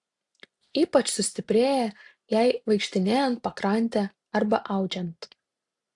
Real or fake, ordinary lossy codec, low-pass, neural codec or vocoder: real; AAC, 64 kbps; 10.8 kHz; none